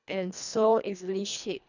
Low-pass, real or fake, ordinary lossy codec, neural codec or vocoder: 7.2 kHz; fake; none; codec, 24 kHz, 1.5 kbps, HILCodec